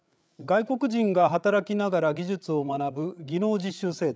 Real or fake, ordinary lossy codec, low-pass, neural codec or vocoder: fake; none; none; codec, 16 kHz, 8 kbps, FreqCodec, larger model